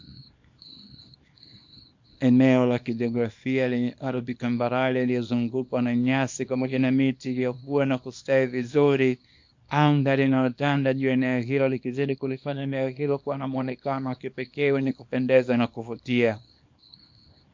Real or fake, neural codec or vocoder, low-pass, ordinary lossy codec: fake; codec, 24 kHz, 0.9 kbps, WavTokenizer, small release; 7.2 kHz; MP3, 48 kbps